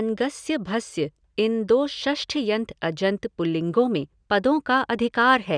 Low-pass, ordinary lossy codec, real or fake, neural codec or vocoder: 9.9 kHz; none; real; none